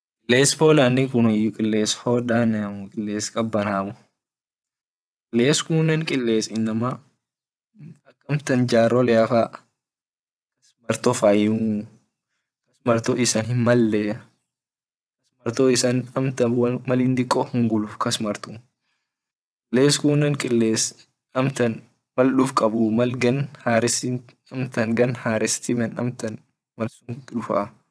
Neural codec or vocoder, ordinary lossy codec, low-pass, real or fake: vocoder, 22.05 kHz, 80 mel bands, WaveNeXt; none; none; fake